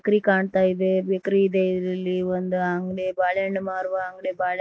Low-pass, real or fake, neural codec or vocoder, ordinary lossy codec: none; real; none; none